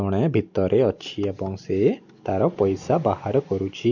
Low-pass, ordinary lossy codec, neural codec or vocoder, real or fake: 7.2 kHz; none; none; real